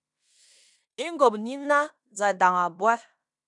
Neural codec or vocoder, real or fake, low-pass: codec, 16 kHz in and 24 kHz out, 0.9 kbps, LongCat-Audio-Codec, fine tuned four codebook decoder; fake; 10.8 kHz